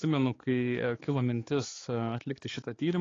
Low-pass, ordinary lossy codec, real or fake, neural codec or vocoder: 7.2 kHz; AAC, 32 kbps; fake; codec, 16 kHz, 4 kbps, X-Codec, HuBERT features, trained on balanced general audio